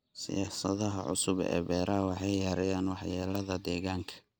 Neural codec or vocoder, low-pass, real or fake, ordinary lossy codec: none; none; real; none